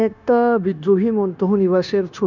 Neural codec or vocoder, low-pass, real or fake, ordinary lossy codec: codec, 16 kHz, 0.9 kbps, LongCat-Audio-Codec; 7.2 kHz; fake; none